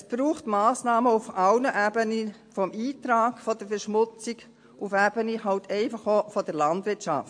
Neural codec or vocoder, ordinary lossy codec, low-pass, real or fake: none; MP3, 48 kbps; 9.9 kHz; real